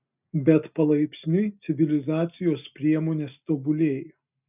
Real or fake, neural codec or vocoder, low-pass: real; none; 3.6 kHz